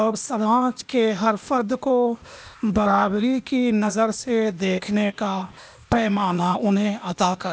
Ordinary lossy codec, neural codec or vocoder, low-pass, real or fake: none; codec, 16 kHz, 0.8 kbps, ZipCodec; none; fake